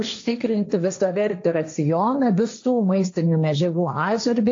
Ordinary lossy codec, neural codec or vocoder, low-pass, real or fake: AAC, 48 kbps; codec, 16 kHz, 1.1 kbps, Voila-Tokenizer; 7.2 kHz; fake